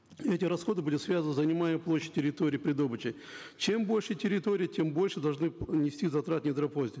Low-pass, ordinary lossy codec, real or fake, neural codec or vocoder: none; none; real; none